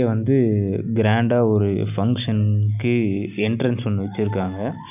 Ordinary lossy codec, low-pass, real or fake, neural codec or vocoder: none; 3.6 kHz; real; none